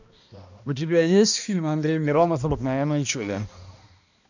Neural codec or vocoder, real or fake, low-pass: codec, 16 kHz, 1 kbps, X-Codec, HuBERT features, trained on balanced general audio; fake; 7.2 kHz